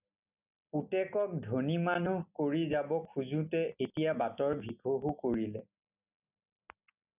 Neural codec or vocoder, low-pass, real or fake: none; 3.6 kHz; real